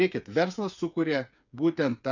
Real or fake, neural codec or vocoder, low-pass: fake; codec, 16 kHz, 8 kbps, FreqCodec, smaller model; 7.2 kHz